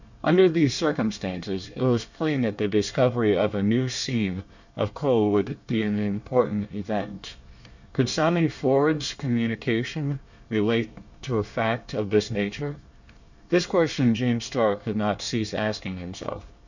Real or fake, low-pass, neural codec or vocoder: fake; 7.2 kHz; codec, 24 kHz, 1 kbps, SNAC